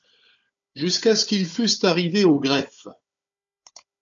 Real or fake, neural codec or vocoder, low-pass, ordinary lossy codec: fake; codec, 16 kHz, 4 kbps, FunCodec, trained on Chinese and English, 50 frames a second; 7.2 kHz; MP3, 64 kbps